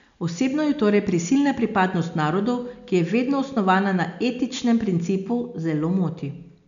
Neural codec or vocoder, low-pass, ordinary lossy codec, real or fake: none; 7.2 kHz; none; real